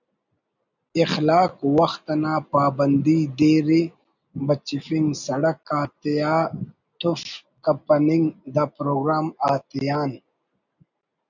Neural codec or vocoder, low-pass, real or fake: none; 7.2 kHz; real